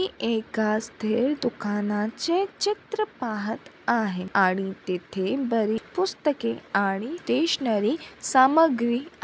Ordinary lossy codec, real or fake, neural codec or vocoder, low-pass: none; real; none; none